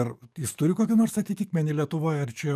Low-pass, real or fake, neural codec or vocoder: 14.4 kHz; fake; codec, 44.1 kHz, 7.8 kbps, DAC